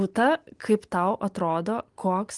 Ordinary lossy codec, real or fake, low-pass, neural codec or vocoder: Opus, 24 kbps; real; 10.8 kHz; none